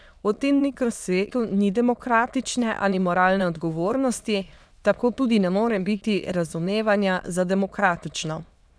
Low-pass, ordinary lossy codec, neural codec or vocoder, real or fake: none; none; autoencoder, 22.05 kHz, a latent of 192 numbers a frame, VITS, trained on many speakers; fake